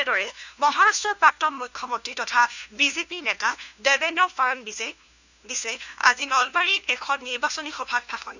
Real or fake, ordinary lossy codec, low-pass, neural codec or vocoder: fake; none; 7.2 kHz; codec, 16 kHz, 1 kbps, FunCodec, trained on LibriTTS, 50 frames a second